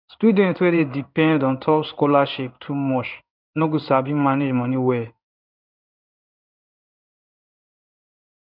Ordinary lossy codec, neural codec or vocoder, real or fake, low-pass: none; codec, 16 kHz in and 24 kHz out, 1 kbps, XY-Tokenizer; fake; 5.4 kHz